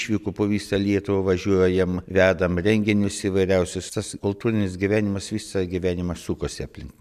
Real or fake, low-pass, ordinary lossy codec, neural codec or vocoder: real; 14.4 kHz; MP3, 96 kbps; none